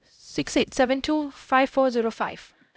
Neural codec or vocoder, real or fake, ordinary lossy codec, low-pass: codec, 16 kHz, 0.5 kbps, X-Codec, HuBERT features, trained on LibriSpeech; fake; none; none